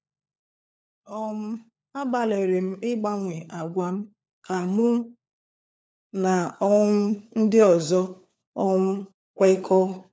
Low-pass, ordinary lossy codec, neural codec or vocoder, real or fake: none; none; codec, 16 kHz, 4 kbps, FunCodec, trained on LibriTTS, 50 frames a second; fake